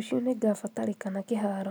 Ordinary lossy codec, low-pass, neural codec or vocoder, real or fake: none; none; none; real